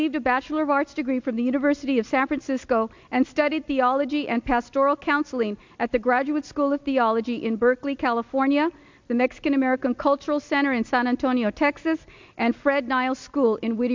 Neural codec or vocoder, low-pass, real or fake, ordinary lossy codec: none; 7.2 kHz; real; MP3, 64 kbps